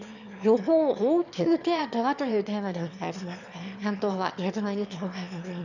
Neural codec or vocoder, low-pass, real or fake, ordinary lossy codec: autoencoder, 22.05 kHz, a latent of 192 numbers a frame, VITS, trained on one speaker; 7.2 kHz; fake; none